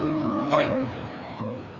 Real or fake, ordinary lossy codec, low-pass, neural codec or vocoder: fake; none; 7.2 kHz; codec, 16 kHz, 2 kbps, FreqCodec, larger model